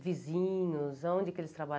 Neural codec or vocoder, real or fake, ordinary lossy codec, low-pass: none; real; none; none